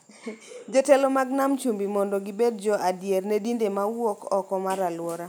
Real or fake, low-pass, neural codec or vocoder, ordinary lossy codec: real; none; none; none